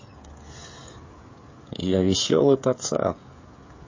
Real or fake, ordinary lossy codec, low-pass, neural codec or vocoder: fake; MP3, 32 kbps; 7.2 kHz; codec, 16 kHz, 16 kbps, FreqCodec, smaller model